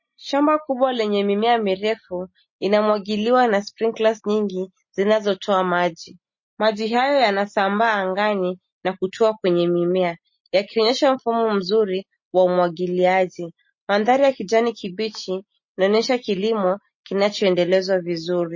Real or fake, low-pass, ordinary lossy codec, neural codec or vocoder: real; 7.2 kHz; MP3, 32 kbps; none